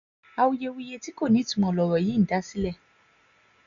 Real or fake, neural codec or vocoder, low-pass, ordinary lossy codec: real; none; 7.2 kHz; none